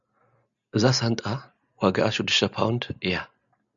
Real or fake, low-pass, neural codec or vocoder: real; 7.2 kHz; none